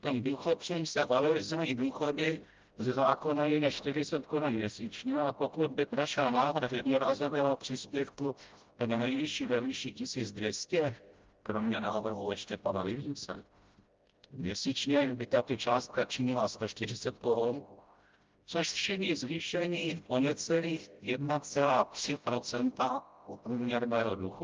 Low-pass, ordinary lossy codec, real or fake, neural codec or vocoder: 7.2 kHz; Opus, 24 kbps; fake; codec, 16 kHz, 0.5 kbps, FreqCodec, smaller model